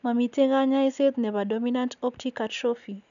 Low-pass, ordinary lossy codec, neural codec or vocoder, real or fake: 7.2 kHz; none; none; real